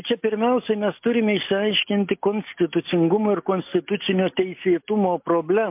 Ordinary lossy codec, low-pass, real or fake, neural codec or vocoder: MP3, 32 kbps; 3.6 kHz; real; none